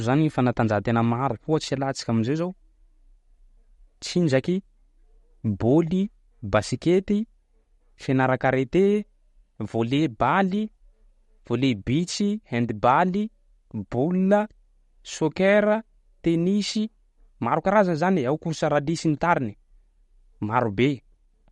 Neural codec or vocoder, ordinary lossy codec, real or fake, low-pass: none; MP3, 48 kbps; real; 19.8 kHz